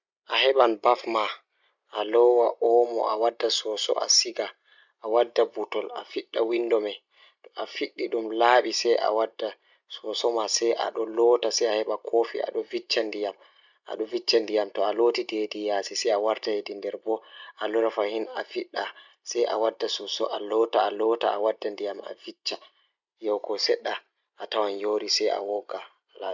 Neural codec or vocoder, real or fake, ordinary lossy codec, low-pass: none; real; none; 7.2 kHz